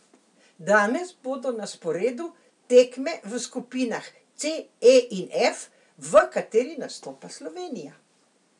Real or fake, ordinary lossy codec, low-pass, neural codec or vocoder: real; none; 10.8 kHz; none